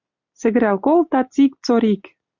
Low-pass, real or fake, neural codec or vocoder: 7.2 kHz; real; none